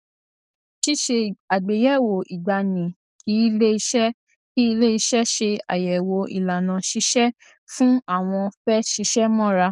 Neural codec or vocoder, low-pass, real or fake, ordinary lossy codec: codec, 44.1 kHz, 7.8 kbps, DAC; 10.8 kHz; fake; none